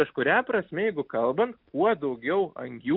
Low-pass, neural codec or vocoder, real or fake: 5.4 kHz; none; real